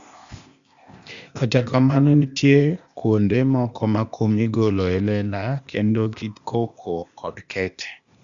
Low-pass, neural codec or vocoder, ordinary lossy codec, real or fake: 7.2 kHz; codec, 16 kHz, 0.8 kbps, ZipCodec; Opus, 64 kbps; fake